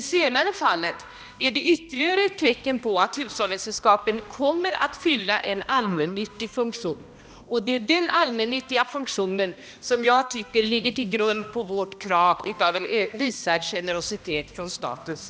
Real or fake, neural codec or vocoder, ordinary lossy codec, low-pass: fake; codec, 16 kHz, 1 kbps, X-Codec, HuBERT features, trained on balanced general audio; none; none